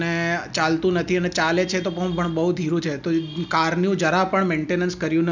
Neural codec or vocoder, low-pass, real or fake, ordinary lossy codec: none; 7.2 kHz; real; none